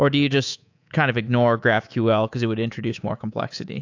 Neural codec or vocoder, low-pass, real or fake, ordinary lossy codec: codec, 24 kHz, 3.1 kbps, DualCodec; 7.2 kHz; fake; AAC, 48 kbps